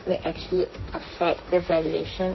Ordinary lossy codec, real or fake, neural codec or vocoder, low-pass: MP3, 24 kbps; fake; codec, 16 kHz, 1.1 kbps, Voila-Tokenizer; 7.2 kHz